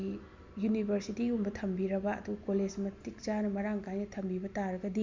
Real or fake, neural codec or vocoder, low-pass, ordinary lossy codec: real; none; 7.2 kHz; MP3, 64 kbps